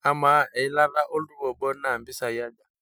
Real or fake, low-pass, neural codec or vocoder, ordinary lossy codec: real; none; none; none